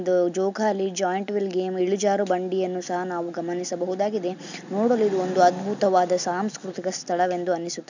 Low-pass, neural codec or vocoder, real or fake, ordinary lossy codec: 7.2 kHz; none; real; none